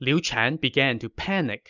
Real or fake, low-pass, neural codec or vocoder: real; 7.2 kHz; none